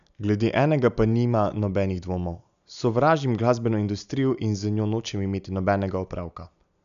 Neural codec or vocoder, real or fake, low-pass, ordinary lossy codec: none; real; 7.2 kHz; none